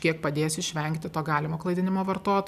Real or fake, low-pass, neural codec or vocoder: real; 14.4 kHz; none